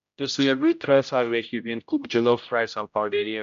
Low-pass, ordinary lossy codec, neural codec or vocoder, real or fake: 7.2 kHz; MP3, 64 kbps; codec, 16 kHz, 0.5 kbps, X-Codec, HuBERT features, trained on general audio; fake